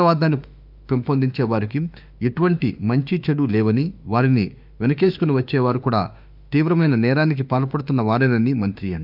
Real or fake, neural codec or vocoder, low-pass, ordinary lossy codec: fake; autoencoder, 48 kHz, 32 numbers a frame, DAC-VAE, trained on Japanese speech; 5.4 kHz; none